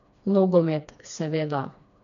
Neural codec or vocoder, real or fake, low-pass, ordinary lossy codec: codec, 16 kHz, 2 kbps, FreqCodec, smaller model; fake; 7.2 kHz; none